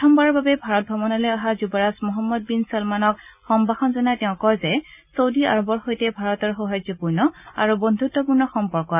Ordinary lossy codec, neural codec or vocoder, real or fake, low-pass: none; none; real; 3.6 kHz